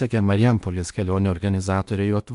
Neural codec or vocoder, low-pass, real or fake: codec, 16 kHz in and 24 kHz out, 0.8 kbps, FocalCodec, streaming, 65536 codes; 10.8 kHz; fake